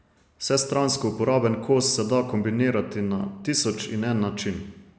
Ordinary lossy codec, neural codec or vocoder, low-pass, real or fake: none; none; none; real